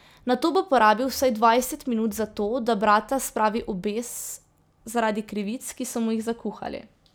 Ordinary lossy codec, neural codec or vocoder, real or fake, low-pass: none; none; real; none